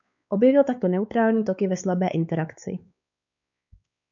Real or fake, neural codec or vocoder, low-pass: fake; codec, 16 kHz, 4 kbps, X-Codec, WavLM features, trained on Multilingual LibriSpeech; 7.2 kHz